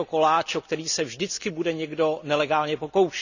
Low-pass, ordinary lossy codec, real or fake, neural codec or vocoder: 7.2 kHz; none; real; none